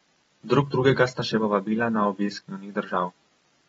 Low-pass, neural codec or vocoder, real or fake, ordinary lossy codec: 19.8 kHz; none; real; AAC, 24 kbps